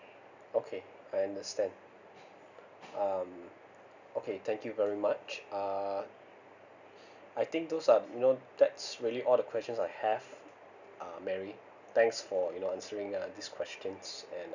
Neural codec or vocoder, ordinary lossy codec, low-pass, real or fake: none; none; 7.2 kHz; real